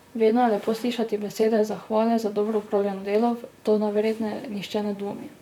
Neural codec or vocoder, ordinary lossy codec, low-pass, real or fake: vocoder, 44.1 kHz, 128 mel bands, Pupu-Vocoder; none; 19.8 kHz; fake